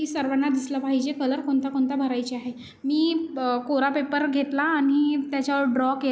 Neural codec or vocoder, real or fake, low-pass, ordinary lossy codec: none; real; none; none